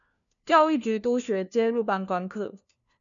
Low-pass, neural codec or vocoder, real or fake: 7.2 kHz; codec, 16 kHz, 1 kbps, FunCodec, trained on LibriTTS, 50 frames a second; fake